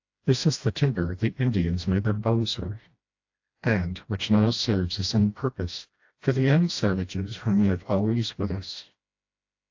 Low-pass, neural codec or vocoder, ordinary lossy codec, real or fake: 7.2 kHz; codec, 16 kHz, 1 kbps, FreqCodec, smaller model; AAC, 48 kbps; fake